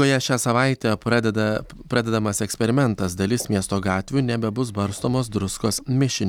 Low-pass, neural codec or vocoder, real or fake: 19.8 kHz; none; real